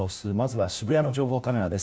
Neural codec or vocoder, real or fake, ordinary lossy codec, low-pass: codec, 16 kHz, 1 kbps, FunCodec, trained on LibriTTS, 50 frames a second; fake; none; none